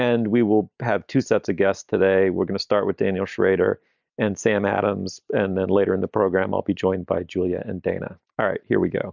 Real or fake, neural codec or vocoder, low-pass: real; none; 7.2 kHz